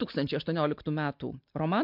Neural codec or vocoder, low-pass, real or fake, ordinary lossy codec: none; 5.4 kHz; real; MP3, 48 kbps